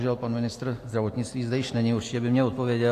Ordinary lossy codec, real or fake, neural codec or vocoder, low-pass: AAC, 64 kbps; real; none; 14.4 kHz